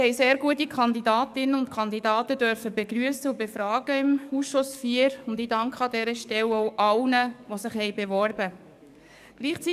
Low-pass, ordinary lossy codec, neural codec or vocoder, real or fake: 14.4 kHz; none; codec, 44.1 kHz, 7.8 kbps, DAC; fake